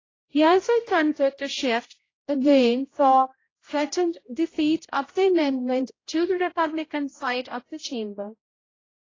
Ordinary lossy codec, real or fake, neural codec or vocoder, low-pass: AAC, 32 kbps; fake; codec, 16 kHz, 0.5 kbps, X-Codec, HuBERT features, trained on general audio; 7.2 kHz